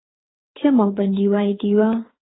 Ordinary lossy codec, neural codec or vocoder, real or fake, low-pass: AAC, 16 kbps; codec, 24 kHz, 6 kbps, HILCodec; fake; 7.2 kHz